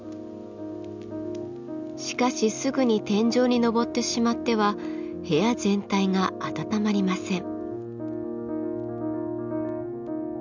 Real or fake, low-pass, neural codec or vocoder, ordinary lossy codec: real; 7.2 kHz; none; none